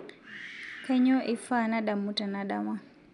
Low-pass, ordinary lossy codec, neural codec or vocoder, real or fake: 10.8 kHz; none; none; real